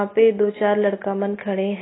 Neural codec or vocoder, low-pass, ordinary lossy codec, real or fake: none; 7.2 kHz; AAC, 16 kbps; real